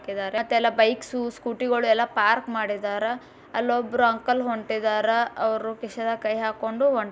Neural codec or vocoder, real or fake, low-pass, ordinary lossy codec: none; real; none; none